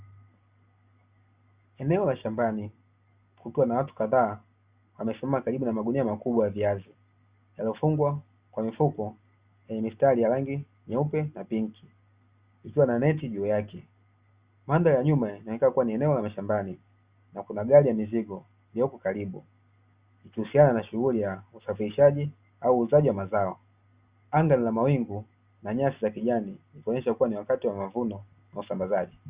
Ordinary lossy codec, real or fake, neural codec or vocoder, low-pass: Opus, 64 kbps; real; none; 3.6 kHz